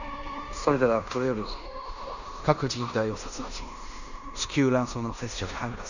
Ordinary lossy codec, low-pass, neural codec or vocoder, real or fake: none; 7.2 kHz; codec, 16 kHz in and 24 kHz out, 0.9 kbps, LongCat-Audio-Codec, fine tuned four codebook decoder; fake